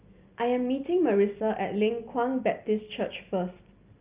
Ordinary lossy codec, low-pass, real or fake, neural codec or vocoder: Opus, 24 kbps; 3.6 kHz; real; none